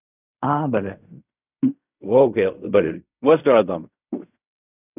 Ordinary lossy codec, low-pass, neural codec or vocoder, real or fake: none; 3.6 kHz; codec, 16 kHz in and 24 kHz out, 0.4 kbps, LongCat-Audio-Codec, fine tuned four codebook decoder; fake